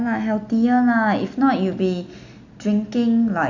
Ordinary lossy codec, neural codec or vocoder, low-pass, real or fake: none; none; 7.2 kHz; real